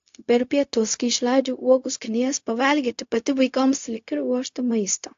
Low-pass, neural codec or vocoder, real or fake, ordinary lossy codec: 7.2 kHz; codec, 16 kHz, 0.4 kbps, LongCat-Audio-Codec; fake; AAC, 48 kbps